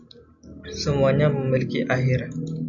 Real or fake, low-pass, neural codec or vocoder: real; 7.2 kHz; none